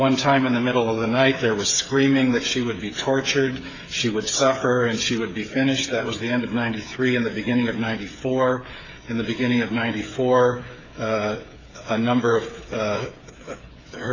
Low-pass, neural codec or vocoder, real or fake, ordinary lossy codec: 7.2 kHz; codec, 16 kHz, 8 kbps, FreqCodec, smaller model; fake; AAC, 32 kbps